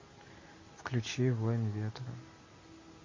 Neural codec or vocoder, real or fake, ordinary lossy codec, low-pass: none; real; MP3, 32 kbps; 7.2 kHz